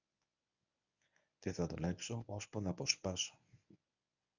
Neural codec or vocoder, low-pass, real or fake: codec, 24 kHz, 0.9 kbps, WavTokenizer, medium speech release version 1; 7.2 kHz; fake